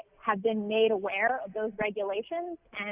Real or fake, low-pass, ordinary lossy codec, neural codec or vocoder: real; 3.6 kHz; Opus, 64 kbps; none